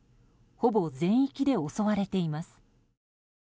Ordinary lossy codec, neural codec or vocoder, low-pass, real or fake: none; none; none; real